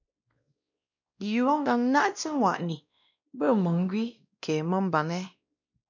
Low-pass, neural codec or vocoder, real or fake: 7.2 kHz; codec, 16 kHz, 1 kbps, X-Codec, WavLM features, trained on Multilingual LibriSpeech; fake